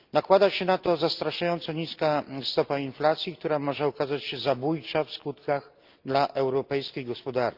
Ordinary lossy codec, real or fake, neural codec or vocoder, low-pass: Opus, 32 kbps; real; none; 5.4 kHz